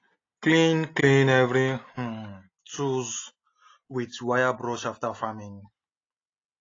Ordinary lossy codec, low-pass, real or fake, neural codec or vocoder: AAC, 32 kbps; 7.2 kHz; real; none